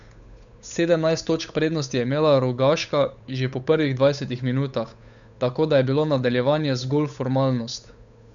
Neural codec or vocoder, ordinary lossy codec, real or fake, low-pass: codec, 16 kHz, 8 kbps, FunCodec, trained on Chinese and English, 25 frames a second; none; fake; 7.2 kHz